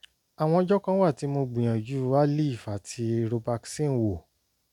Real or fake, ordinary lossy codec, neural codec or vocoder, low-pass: real; none; none; 19.8 kHz